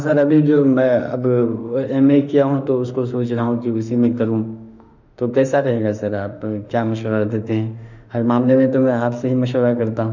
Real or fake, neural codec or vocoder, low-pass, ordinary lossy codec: fake; autoencoder, 48 kHz, 32 numbers a frame, DAC-VAE, trained on Japanese speech; 7.2 kHz; none